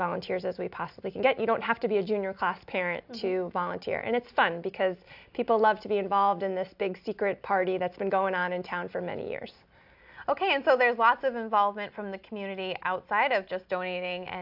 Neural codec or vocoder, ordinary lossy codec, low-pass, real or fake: none; AAC, 48 kbps; 5.4 kHz; real